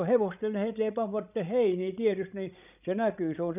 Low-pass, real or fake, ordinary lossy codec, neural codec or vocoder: 3.6 kHz; fake; none; codec, 16 kHz, 16 kbps, FreqCodec, larger model